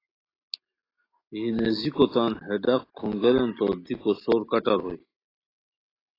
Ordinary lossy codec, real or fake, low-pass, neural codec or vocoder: AAC, 24 kbps; real; 5.4 kHz; none